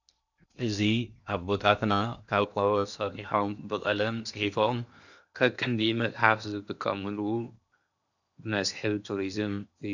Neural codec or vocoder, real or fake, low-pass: codec, 16 kHz in and 24 kHz out, 0.8 kbps, FocalCodec, streaming, 65536 codes; fake; 7.2 kHz